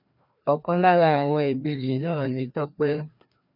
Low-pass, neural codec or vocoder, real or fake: 5.4 kHz; codec, 16 kHz, 2 kbps, FreqCodec, larger model; fake